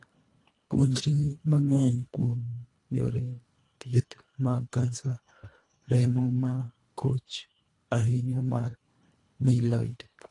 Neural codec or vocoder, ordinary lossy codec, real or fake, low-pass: codec, 24 kHz, 1.5 kbps, HILCodec; AAC, 48 kbps; fake; 10.8 kHz